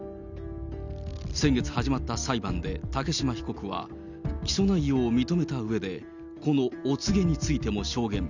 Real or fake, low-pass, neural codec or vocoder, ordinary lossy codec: real; 7.2 kHz; none; none